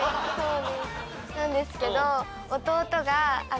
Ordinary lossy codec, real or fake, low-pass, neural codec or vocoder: none; real; none; none